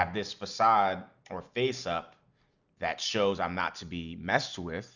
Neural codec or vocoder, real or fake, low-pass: none; real; 7.2 kHz